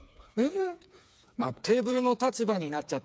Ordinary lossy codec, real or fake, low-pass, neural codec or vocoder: none; fake; none; codec, 16 kHz, 4 kbps, FreqCodec, smaller model